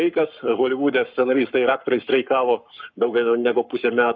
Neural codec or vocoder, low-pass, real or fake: codec, 44.1 kHz, 7.8 kbps, Pupu-Codec; 7.2 kHz; fake